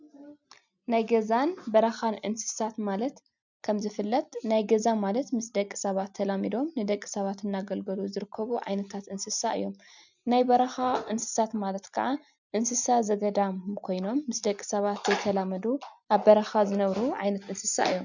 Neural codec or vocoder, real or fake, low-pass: none; real; 7.2 kHz